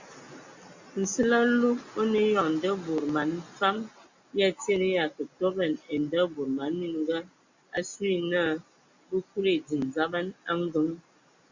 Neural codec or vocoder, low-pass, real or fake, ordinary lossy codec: none; 7.2 kHz; real; Opus, 64 kbps